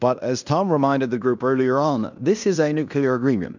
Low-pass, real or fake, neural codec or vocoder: 7.2 kHz; fake; codec, 16 kHz in and 24 kHz out, 0.9 kbps, LongCat-Audio-Codec, fine tuned four codebook decoder